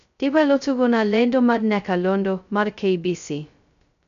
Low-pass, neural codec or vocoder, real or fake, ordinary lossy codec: 7.2 kHz; codec, 16 kHz, 0.2 kbps, FocalCodec; fake; none